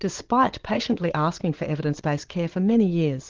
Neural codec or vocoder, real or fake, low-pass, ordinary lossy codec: vocoder, 22.05 kHz, 80 mel bands, Vocos; fake; 7.2 kHz; Opus, 16 kbps